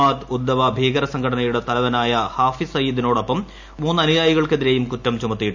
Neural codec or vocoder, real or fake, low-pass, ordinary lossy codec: none; real; 7.2 kHz; none